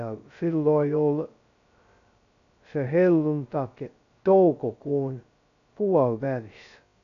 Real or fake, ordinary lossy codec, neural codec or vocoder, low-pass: fake; none; codec, 16 kHz, 0.2 kbps, FocalCodec; 7.2 kHz